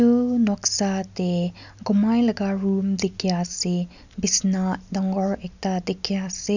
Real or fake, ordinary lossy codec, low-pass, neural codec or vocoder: real; none; 7.2 kHz; none